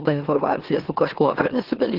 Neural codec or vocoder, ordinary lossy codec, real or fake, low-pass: autoencoder, 44.1 kHz, a latent of 192 numbers a frame, MeloTTS; Opus, 16 kbps; fake; 5.4 kHz